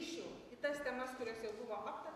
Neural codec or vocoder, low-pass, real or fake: none; 14.4 kHz; real